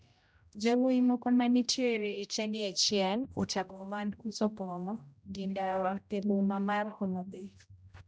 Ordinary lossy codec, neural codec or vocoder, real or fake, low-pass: none; codec, 16 kHz, 0.5 kbps, X-Codec, HuBERT features, trained on general audio; fake; none